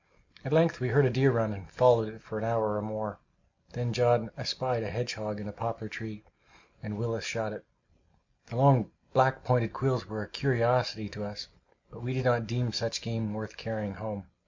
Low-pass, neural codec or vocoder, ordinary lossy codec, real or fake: 7.2 kHz; none; MP3, 48 kbps; real